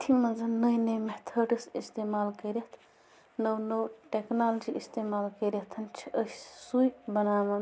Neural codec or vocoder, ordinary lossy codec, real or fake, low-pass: none; none; real; none